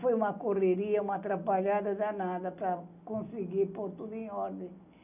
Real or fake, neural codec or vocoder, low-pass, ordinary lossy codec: real; none; 3.6 kHz; none